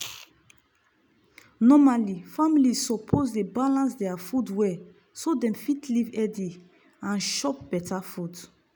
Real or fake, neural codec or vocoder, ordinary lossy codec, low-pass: real; none; none; none